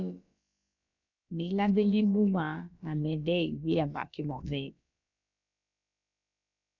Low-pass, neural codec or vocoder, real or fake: 7.2 kHz; codec, 16 kHz, about 1 kbps, DyCAST, with the encoder's durations; fake